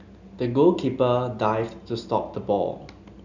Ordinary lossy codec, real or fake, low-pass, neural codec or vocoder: none; real; 7.2 kHz; none